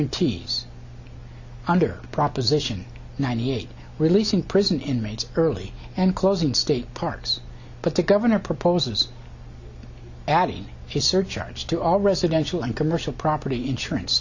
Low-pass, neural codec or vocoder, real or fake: 7.2 kHz; none; real